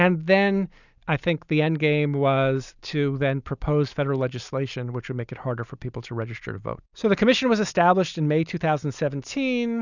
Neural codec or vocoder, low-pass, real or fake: none; 7.2 kHz; real